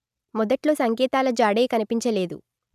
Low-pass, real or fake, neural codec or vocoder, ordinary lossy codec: 14.4 kHz; real; none; none